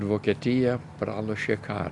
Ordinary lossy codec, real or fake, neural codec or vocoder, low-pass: MP3, 96 kbps; real; none; 10.8 kHz